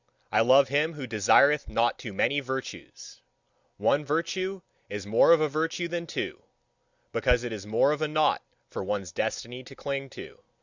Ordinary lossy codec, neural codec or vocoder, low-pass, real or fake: Opus, 64 kbps; none; 7.2 kHz; real